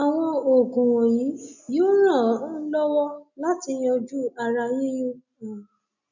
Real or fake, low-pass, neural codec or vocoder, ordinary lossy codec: real; 7.2 kHz; none; none